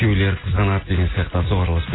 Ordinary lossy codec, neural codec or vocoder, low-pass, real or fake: AAC, 16 kbps; none; 7.2 kHz; real